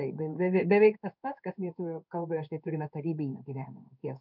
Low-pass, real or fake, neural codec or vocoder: 5.4 kHz; fake; codec, 16 kHz in and 24 kHz out, 1 kbps, XY-Tokenizer